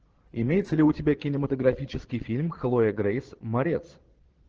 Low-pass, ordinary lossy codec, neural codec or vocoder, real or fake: 7.2 kHz; Opus, 16 kbps; vocoder, 22.05 kHz, 80 mel bands, Vocos; fake